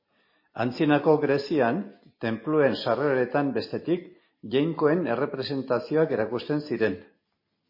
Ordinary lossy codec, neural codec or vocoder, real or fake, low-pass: MP3, 24 kbps; none; real; 5.4 kHz